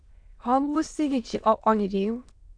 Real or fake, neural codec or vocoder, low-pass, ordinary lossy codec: fake; autoencoder, 22.05 kHz, a latent of 192 numbers a frame, VITS, trained on many speakers; 9.9 kHz; AAC, 64 kbps